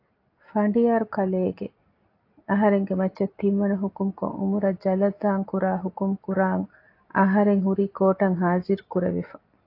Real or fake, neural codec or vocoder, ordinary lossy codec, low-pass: real; none; AAC, 32 kbps; 5.4 kHz